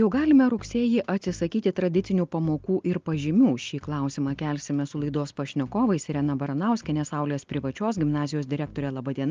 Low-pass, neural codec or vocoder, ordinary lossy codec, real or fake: 7.2 kHz; none; Opus, 32 kbps; real